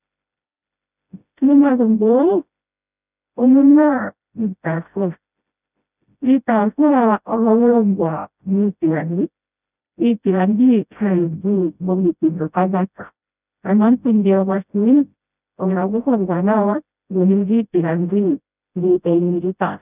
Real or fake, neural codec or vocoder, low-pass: fake; codec, 16 kHz, 0.5 kbps, FreqCodec, smaller model; 3.6 kHz